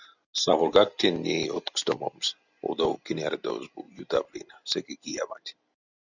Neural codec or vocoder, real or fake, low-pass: none; real; 7.2 kHz